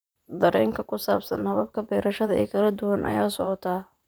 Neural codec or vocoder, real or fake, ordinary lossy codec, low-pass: vocoder, 44.1 kHz, 128 mel bands, Pupu-Vocoder; fake; none; none